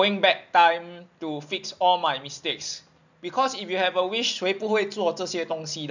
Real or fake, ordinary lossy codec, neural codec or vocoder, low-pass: real; none; none; 7.2 kHz